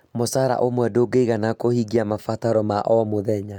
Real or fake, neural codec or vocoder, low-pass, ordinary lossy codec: real; none; 19.8 kHz; none